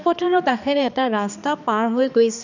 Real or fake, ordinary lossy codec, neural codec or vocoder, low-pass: fake; none; codec, 16 kHz, 2 kbps, X-Codec, HuBERT features, trained on balanced general audio; 7.2 kHz